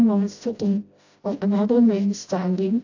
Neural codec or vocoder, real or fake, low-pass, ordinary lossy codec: codec, 16 kHz, 0.5 kbps, FreqCodec, smaller model; fake; 7.2 kHz; AAC, 48 kbps